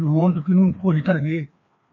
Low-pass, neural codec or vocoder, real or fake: 7.2 kHz; codec, 16 kHz, 2 kbps, FreqCodec, larger model; fake